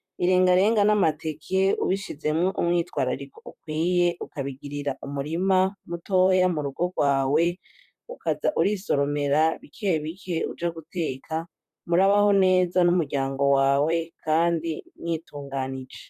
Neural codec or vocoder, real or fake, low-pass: vocoder, 44.1 kHz, 128 mel bands, Pupu-Vocoder; fake; 14.4 kHz